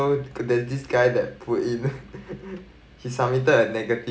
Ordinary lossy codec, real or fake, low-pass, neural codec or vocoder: none; real; none; none